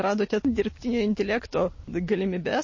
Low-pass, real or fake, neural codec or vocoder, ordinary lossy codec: 7.2 kHz; real; none; MP3, 32 kbps